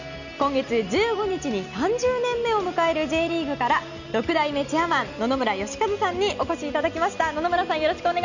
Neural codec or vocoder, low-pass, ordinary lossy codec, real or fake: none; 7.2 kHz; none; real